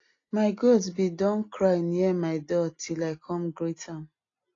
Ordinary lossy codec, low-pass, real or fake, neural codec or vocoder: AAC, 32 kbps; 7.2 kHz; real; none